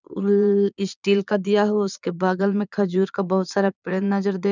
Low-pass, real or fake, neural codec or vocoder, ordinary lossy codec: 7.2 kHz; fake; vocoder, 22.05 kHz, 80 mel bands, Vocos; none